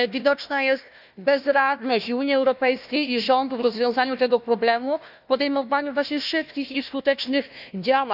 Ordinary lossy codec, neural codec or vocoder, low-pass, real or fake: none; codec, 16 kHz, 1 kbps, FunCodec, trained on Chinese and English, 50 frames a second; 5.4 kHz; fake